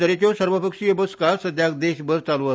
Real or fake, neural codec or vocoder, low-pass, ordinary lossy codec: real; none; none; none